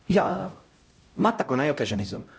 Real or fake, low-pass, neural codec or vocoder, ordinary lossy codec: fake; none; codec, 16 kHz, 0.5 kbps, X-Codec, HuBERT features, trained on LibriSpeech; none